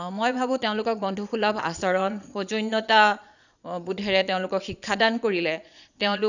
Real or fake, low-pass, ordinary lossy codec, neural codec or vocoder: fake; 7.2 kHz; none; vocoder, 22.05 kHz, 80 mel bands, Vocos